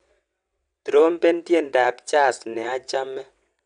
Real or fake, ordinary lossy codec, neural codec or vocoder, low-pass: fake; none; vocoder, 22.05 kHz, 80 mel bands, WaveNeXt; 9.9 kHz